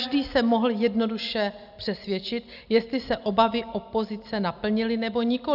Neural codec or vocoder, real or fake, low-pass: none; real; 5.4 kHz